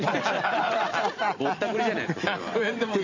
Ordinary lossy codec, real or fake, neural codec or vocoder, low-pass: MP3, 64 kbps; fake; vocoder, 44.1 kHz, 128 mel bands every 512 samples, BigVGAN v2; 7.2 kHz